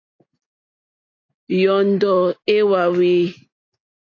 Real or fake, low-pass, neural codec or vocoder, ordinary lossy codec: real; 7.2 kHz; none; AAC, 48 kbps